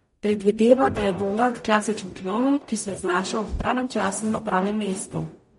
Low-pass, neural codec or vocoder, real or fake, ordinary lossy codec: 19.8 kHz; codec, 44.1 kHz, 0.9 kbps, DAC; fake; MP3, 48 kbps